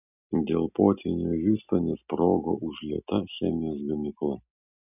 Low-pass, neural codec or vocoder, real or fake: 3.6 kHz; none; real